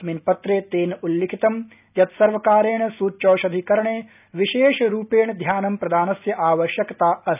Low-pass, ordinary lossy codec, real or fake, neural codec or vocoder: 3.6 kHz; none; real; none